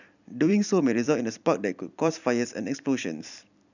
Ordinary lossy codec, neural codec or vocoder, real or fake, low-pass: none; vocoder, 44.1 kHz, 128 mel bands every 256 samples, BigVGAN v2; fake; 7.2 kHz